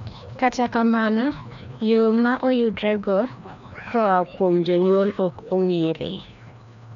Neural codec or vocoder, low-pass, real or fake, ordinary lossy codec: codec, 16 kHz, 1 kbps, FreqCodec, larger model; 7.2 kHz; fake; none